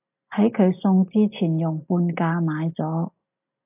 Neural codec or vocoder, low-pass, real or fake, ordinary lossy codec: none; 3.6 kHz; real; AAC, 32 kbps